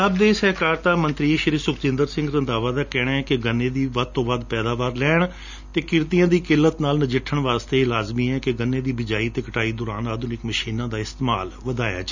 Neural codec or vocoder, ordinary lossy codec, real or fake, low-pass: none; none; real; 7.2 kHz